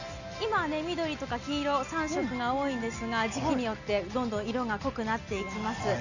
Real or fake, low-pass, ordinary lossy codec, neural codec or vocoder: real; 7.2 kHz; none; none